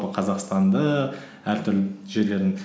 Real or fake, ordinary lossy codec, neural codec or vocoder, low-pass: real; none; none; none